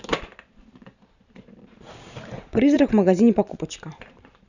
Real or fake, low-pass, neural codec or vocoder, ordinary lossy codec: fake; 7.2 kHz; vocoder, 44.1 kHz, 128 mel bands every 512 samples, BigVGAN v2; none